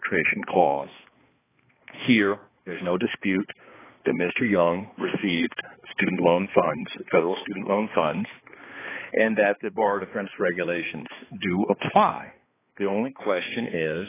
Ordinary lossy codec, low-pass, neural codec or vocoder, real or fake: AAC, 16 kbps; 3.6 kHz; codec, 16 kHz, 2 kbps, X-Codec, HuBERT features, trained on balanced general audio; fake